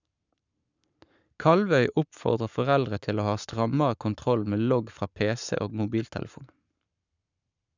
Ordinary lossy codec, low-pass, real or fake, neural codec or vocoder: none; 7.2 kHz; fake; codec, 44.1 kHz, 7.8 kbps, Pupu-Codec